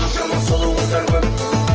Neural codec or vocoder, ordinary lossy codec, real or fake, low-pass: none; Opus, 16 kbps; real; 7.2 kHz